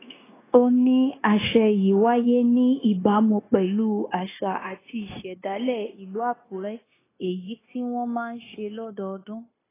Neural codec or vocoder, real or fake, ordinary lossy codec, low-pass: codec, 16 kHz, 0.9 kbps, LongCat-Audio-Codec; fake; AAC, 16 kbps; 3.6 kHz